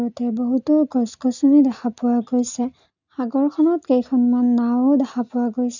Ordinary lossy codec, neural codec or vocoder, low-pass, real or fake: none; none; 7.2 kHz; real